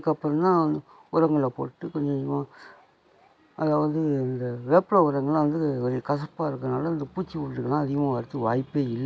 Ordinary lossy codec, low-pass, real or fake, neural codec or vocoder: none; none; real; none